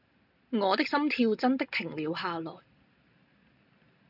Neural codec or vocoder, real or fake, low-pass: none; real; 5.4 kHz